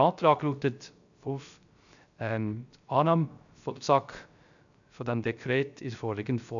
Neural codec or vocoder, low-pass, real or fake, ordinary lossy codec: codec, 16 kHz, 0.3 kbps, FocalCodec; 7.2 kHz; fake; none